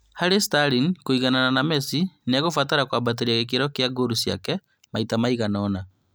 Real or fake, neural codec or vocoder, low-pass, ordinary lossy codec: real; none; none; none